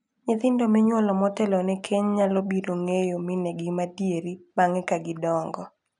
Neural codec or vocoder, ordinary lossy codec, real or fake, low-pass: none; none; real; 10.8 kHz